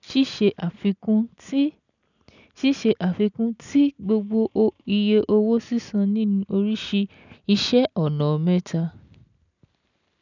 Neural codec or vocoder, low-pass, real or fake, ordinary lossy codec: none; 7.2 kHz; real; none